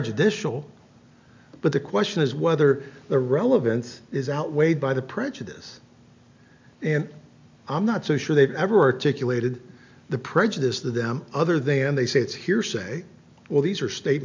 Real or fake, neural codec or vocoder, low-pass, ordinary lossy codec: real; none; 7.2 kHz; MP3, 64 kbps